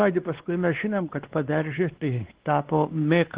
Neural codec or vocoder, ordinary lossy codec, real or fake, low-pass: codec, 16 kHz, 2 kbps, X-Codec, WavLM features, trained on Multilingual LibriSpeech; Opus, 16 kbps; fake; 3.6 kHz